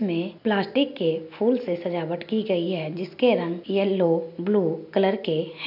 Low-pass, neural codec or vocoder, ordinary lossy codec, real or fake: 5.4 kHz; none; none; real